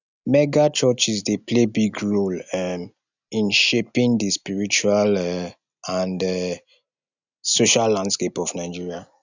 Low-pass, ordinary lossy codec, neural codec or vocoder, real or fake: 7.2 kHz; none; none; real